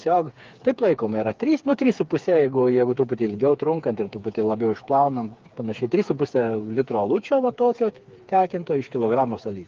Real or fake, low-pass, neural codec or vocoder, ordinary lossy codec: fake; 7.2 kHz; codec, 16 kHz, 4 kbps, FreqCodec, smaller model; Opus, 32 kbps